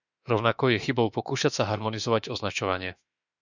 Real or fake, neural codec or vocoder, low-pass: fake; autoencoder, 48 kHz, 32 numbers a frame, DAC-VAE, trained on Japanese speech; 7.2 kHz